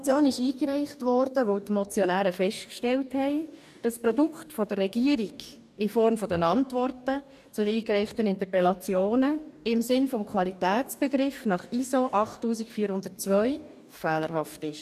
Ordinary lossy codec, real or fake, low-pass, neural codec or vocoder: none; fake; 14.4 kHz; codec, 44.1 kHz, 2.6 kbps, DAC